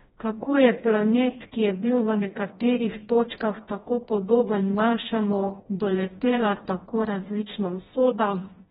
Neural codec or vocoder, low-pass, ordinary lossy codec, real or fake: codec, 16 kHz, 1 kbps, FreqCodec, smaller model; 7.2 kHz; AAC, 16 kbps; fake